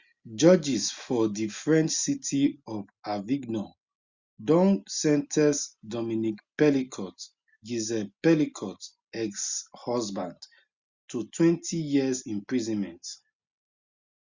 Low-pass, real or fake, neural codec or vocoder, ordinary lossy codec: 7.2 kHz; real; none; Opus, 64 kbps